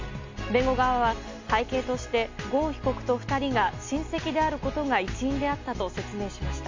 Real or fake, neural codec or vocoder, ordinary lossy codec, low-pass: real; none; MP3, 48 kbps; 7.2 kHz